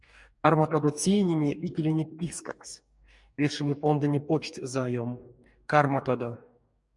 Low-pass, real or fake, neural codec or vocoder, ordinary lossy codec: 10.8 kHz; fake; codec, 44.1 kHz, 2.6 kbps, DAC; MP3, 96 kbps